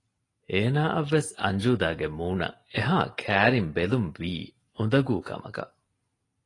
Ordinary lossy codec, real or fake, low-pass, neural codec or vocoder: AAC, 32 kbps; real; 10.8 kHz; none